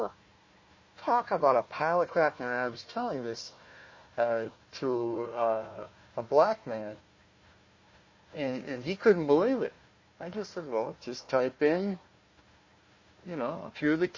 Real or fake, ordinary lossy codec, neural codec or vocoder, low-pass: fake; MP3, 32 kbps; codec, 16 kHz, 1 kbps, FunCodec, trained on Chinese and English, 50 frames a second; 7.2 kHz